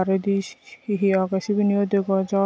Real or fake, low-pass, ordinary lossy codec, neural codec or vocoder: real; none; none; none